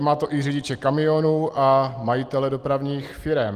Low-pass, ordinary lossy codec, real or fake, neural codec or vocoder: 14.4 kHz; Opus, 24 kbps; real; none